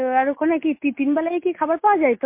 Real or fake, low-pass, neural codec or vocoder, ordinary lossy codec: real; 3.6 kHz; none; MP3, 24 kbps